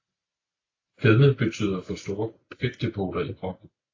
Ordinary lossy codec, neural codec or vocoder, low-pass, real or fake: AAC, 32 kbps; none; 7.2 kHz; real